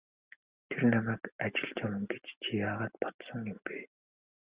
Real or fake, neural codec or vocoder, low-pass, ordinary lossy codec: real; none; 3.6 kHz; Opus, 64 kbps